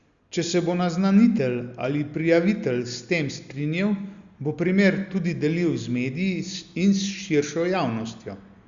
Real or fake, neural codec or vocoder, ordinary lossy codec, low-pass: real; none; Opus, 64 kbps; 7.2 kHz